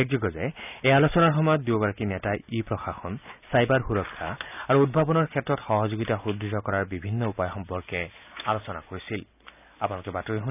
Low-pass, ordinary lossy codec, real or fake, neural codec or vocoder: 3.6 kHz; none; real; none